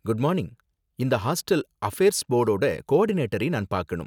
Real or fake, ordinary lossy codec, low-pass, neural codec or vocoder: real; none; 19.8 kHz; none